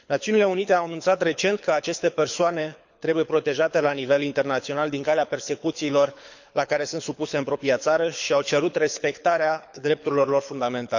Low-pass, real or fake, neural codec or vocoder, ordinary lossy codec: 7.2 kHz; fake; codec, 24 kHz, 6 kbps, HILCodec; none